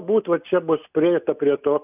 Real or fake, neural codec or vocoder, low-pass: real; none; 3.6 kHz